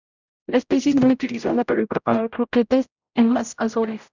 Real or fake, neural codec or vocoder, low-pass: fake; codec, 16 kHz, 0.5 kbps, X-Codec, HuBERT features, trained on general audio; 7.2 kHz